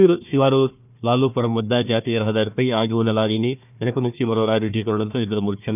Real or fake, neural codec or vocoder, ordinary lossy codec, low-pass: fake; codec, 16 kHz, 1 kbps, FunCodec, trained on Chinese and English, 50 frames a second; none; 3.6 kHz